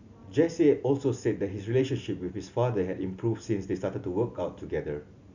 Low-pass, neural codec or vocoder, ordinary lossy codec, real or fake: 7.2 kHz; none; none; real